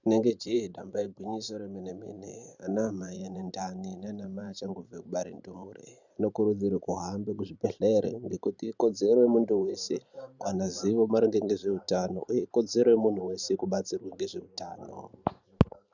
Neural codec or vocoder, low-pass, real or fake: vocoder, 44.1 kHz, 128 mel bands every 512 samples, BigVGAN v2; 7.2 kHz; fake